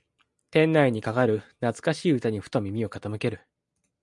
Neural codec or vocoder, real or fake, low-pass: none; real; 10.8 kHz